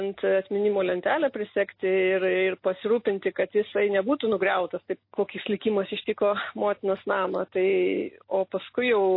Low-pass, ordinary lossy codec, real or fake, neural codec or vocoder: 5.4 kHz; MP3, 24 kbps; real; none